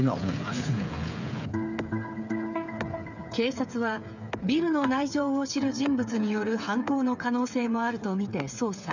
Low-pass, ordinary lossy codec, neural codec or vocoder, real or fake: 7.2 kHz; none; codec, 16 kHz, 8 kbps, FreqCodec, smaller model; fake